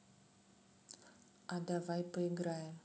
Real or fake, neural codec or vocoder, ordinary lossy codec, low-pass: real; none; none; none